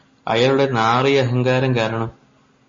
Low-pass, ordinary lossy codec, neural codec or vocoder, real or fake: 7.2 kHz; MP3, 32 kbps; none; real